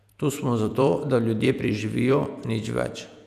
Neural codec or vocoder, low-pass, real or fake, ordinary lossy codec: vocoder, 48 kHz, 128 mel bands, Vocos; 14.4 kHz; fake; none